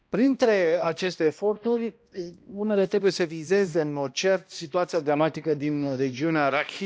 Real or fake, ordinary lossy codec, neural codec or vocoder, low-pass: fake; none; codec, 16 kHz, 1 kbps, X-Codec, HuBERT features, trained on balanced general audio; none